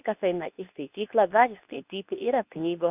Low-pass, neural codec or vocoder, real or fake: 3.6 kHz; codec, 24 kHz, 0.9 kbps, WavTokenizer, medium speech release version 2; fake